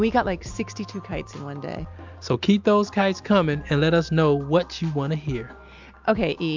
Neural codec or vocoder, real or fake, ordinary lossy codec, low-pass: none; real; MP3, 64 kbps; 7.2 kHz